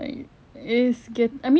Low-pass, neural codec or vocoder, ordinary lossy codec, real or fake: none; none; none; real